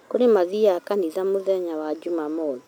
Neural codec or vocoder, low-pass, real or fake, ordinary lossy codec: none; none; real; none